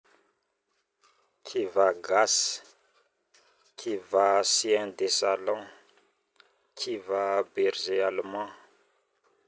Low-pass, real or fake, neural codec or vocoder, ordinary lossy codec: none; real; none; none